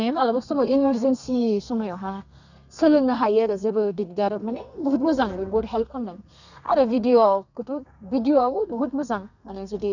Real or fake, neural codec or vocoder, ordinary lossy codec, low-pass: fake; codec, 32 kHz, 1.9 kbps, SNAC; none; 7.2 kHz